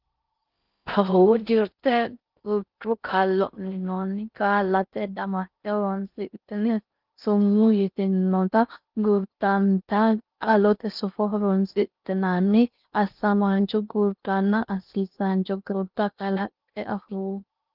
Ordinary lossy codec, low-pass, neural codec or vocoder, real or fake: Opus, 24 kbps; 5.4 kHz; codec, 16 kHz in and 24 kHz out, 0.6 kbps, FocalCodec, streaming, 4096 codes; fake